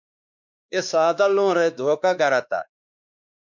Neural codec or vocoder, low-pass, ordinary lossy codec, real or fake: codec, 16 kHz, 2 kbps, X-Codec, WavLM features, trained on Multilingual LibriSpeech; 7.2 kHz; MP3, 64 kbps; fake